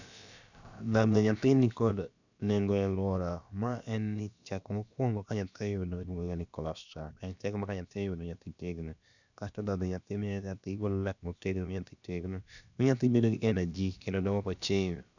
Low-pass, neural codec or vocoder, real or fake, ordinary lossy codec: 7.2 kHz; codec, 16 kHz, about 1 kbps, DyCAST, with the encoder's durations; fake; none